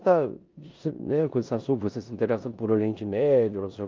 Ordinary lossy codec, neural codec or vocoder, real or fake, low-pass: Opus, 32 kbps; codec, 16 kHz in and 24 kHz out, 0.9 kbps, LongCat-Audio-Codec, four codebook decoder; fake; 7.2 kHz